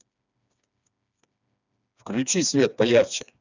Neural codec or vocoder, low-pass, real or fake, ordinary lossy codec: codec, 16 kHz, 2 kbps, FreqCodec, smaller model; 7.2 kHz; fake; none